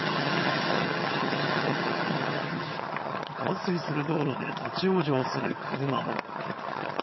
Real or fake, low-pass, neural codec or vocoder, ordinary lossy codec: fake; 7.2 kHz; vocoder, 22.05 kHz, 80 mel bands, HiFi-GAN; MP3, 24 kbps